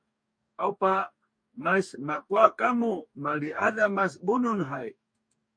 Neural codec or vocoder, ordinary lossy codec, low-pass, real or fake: codec, 44.1 kHz, 2.6 kbps, DAC; MP3, 48 kbps; 9.9 kHz; fake